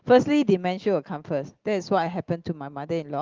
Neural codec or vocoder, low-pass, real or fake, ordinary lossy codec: none; 7.2 kHz; real; Opus, 16 kbps